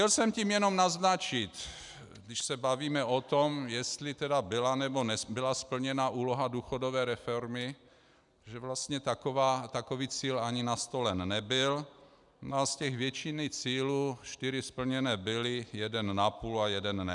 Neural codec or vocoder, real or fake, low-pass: none; real; 10.8 kHz